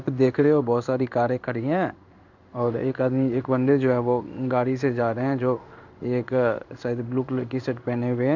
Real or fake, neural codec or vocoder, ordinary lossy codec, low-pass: fake; codec, 16 kHz in and 24 kHz out, 1 kbps, XY-Tokenizer; none; 7.2 kHz